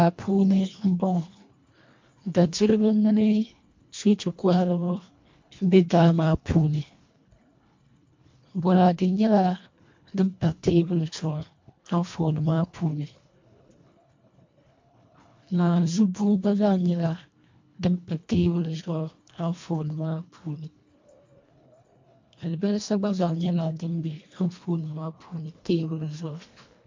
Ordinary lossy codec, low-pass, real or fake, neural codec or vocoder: MP3, 48 kbps; 7.2 kHz; fake; codec, 24 kHz, 1.5 kbps, HILCodec